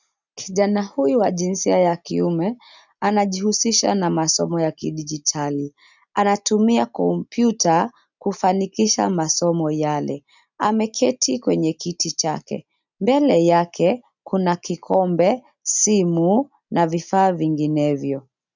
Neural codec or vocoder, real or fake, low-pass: none; real; 7.2 kHz